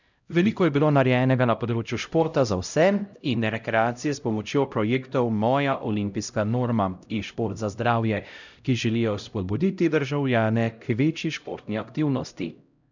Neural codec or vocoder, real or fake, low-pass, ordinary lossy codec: codec, 16 kHz, 0.5 kbps, X-Codec, HuBERT features, trained on LibriSpeech; fake; 7.2 kHz; none